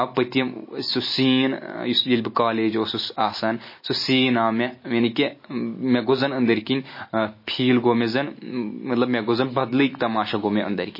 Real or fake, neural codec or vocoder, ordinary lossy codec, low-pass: real; none; MP3, 24 kbps; 5.4 kHz